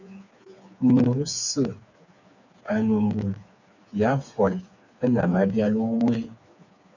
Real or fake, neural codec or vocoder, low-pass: fake; codec, 16 kHz, 4 kbps, FreqCodec, smaller model; 7.2 kHz